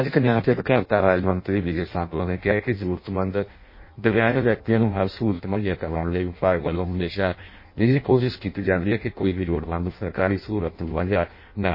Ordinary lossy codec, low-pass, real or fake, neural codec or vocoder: MP3, 24 kbps; 5.4 kHz; fake; codec, 16 kHz in and 24 kHz out, 0.6 kbps, FireRedTTS-2 codec